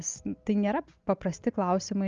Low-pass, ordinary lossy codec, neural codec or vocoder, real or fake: 7.2 kHz; Opus, 32 kbps; none; real